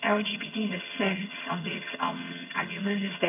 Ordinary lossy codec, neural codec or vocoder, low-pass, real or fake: none; vocoder, 22.05 kHz, 80 mel bands, HiFi-GAN; 3.6 kHz; fake